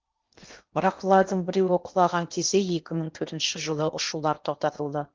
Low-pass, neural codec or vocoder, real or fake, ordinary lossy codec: 7.2 kHz; codec, 16 kHz in and 24 kHz out, 0.8 kbps, FocalCodec, streaming, 65536 codes; fake; Opus, 24 kbps